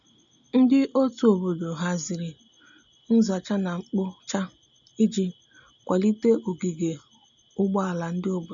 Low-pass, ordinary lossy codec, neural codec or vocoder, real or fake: 7.2 kHz; none; none; real